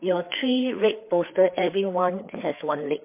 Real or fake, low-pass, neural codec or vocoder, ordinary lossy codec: fake; 3.6 kHz; codec, 16 kHz, 4 kbps, FreqCodec, larger model; MP3, 24 kbps